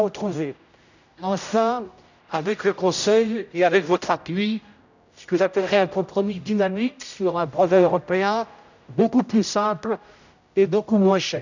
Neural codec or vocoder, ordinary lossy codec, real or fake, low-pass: codec, 16 kHz, 0.5 kbps, X-Codec, HuBERT features, trained on general audio; none; fake; 7.2 kHz